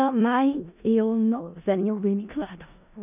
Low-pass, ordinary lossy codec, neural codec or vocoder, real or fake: 3.6 kHz; none; codec, 16 kHz in and 24 kHz out, 0.4 kbps, LongCat-Audio-Codec, four codebook decoder; fake